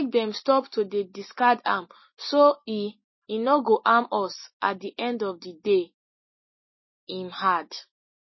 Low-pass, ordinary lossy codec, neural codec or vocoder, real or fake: 7.2 kHz; MP3, 24 kbps; none; real